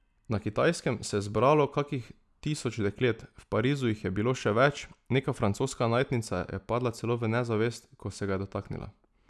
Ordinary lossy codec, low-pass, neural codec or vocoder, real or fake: none; none; none; real